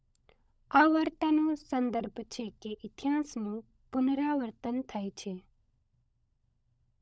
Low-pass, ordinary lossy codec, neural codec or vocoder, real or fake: none; none; codec, 16 kHz, 16 kbps, FunCodec, trained on LibriTTS, 50 frames a second; fake